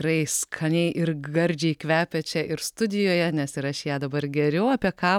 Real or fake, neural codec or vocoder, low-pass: real; none; 19.8 kHz